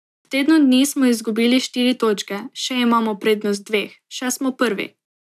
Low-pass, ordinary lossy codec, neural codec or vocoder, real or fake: 14.4 kHz; none; none; real